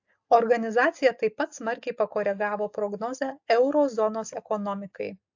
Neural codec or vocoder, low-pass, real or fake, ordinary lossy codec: none; 7.2 kHz; real; AAC, 48 kbps